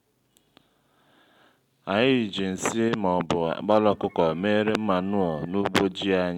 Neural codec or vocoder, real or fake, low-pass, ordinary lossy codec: none; real; 19.8 kHz; MP3, 96 kbps